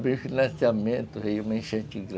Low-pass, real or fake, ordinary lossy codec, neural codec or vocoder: none; real; none; none